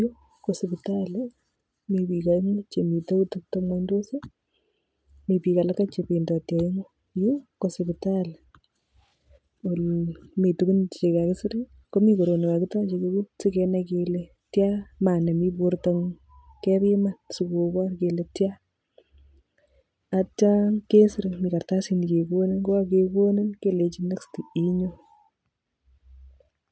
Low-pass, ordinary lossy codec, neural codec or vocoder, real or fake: none; none; none; real